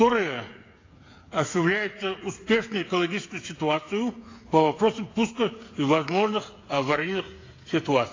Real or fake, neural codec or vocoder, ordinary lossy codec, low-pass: fake; codec, 16 kHz, 8 kbps, FreqCodec, smaller model; AAC, 32 kbps; 7.2 kHz